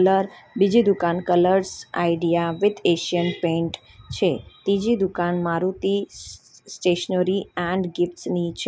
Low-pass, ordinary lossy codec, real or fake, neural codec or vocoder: none; none; real; none